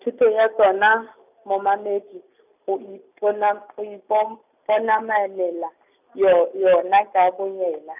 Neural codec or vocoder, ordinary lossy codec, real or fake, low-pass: none; none; real; 3.6 kHz